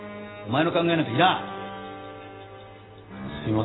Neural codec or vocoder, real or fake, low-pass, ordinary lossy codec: none; real; 7.2 kHz; AAC, 16 kbps